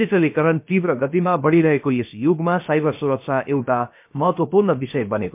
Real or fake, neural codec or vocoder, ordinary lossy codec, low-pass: fake; codec, 16 kHz, about 1 kbps, DyCAST, with the encoder's durations; MP3, 32 kbps; 3.6 kHz